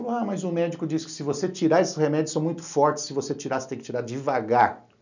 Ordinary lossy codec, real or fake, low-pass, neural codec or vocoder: none; real; 7.2 kHz; none